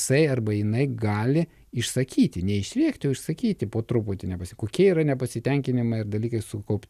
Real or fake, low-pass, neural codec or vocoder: real; 14.4 kHz; none